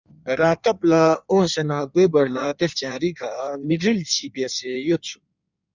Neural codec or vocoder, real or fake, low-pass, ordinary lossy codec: codec, 16 kHz in and 24 kHz out, 1.1 kbps, FireRedTTS-2 codec; fake; 7.2 kHz; Opus, 64 kbps